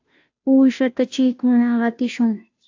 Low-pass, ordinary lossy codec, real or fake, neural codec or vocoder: 7.2 kHz; AAC, 48 kbps; fake; codec, 16 kHz, 0.5 kbps, FunCodec, trained on Chinese and English, 25 frames a second